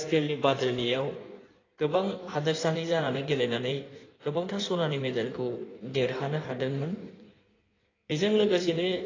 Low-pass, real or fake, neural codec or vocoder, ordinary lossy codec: 7.2 kHz; fake; codec, 16 kHz in and 24 kHz out, 1.1 kbps, FireRedTTS-2 codec; AAC, 32 kbps